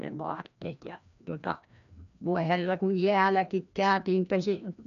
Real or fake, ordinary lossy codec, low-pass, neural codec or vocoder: fake; none; 7.2 kHz; codec, 16 kHz, 1 kbps, FreqCodec, larger model